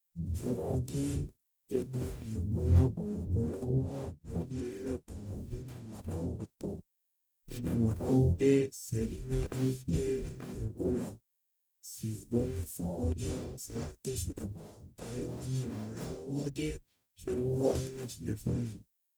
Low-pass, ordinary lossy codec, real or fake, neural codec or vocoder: none; none; fake; codec, 44.1 kHz, 0.9 kbps, DAC